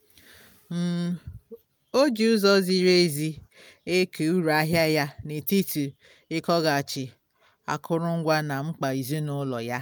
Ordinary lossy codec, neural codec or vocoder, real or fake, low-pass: none; none; real; none